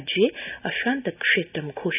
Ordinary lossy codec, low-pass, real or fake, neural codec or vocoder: none; 3.6 kHz; real; none